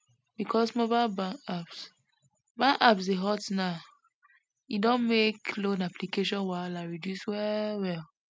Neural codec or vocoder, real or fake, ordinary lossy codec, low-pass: none; real; none; none